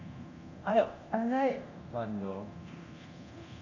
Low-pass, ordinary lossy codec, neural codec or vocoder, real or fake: 7.2 kHz; AAC, 48 kbps; codec, 24 kHz, 0.9 kbps, DualCodec; fake